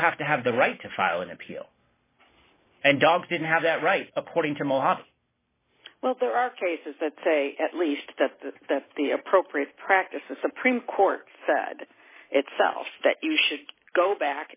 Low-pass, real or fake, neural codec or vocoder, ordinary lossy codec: 3.6 kHz; real; none; MP3, 16 kbps